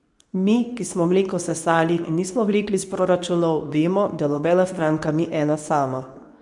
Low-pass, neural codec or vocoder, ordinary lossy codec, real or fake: 10.8 kHz; codec, 24 kHz, 0.9 kbps, WavTokenizer, medium speech release version 2; MP3, 96 kbps; fake